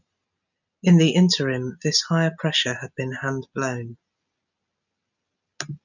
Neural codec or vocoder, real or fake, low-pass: none; real; 7.2 kHz